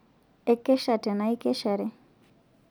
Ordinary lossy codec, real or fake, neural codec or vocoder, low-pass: none; real; none; none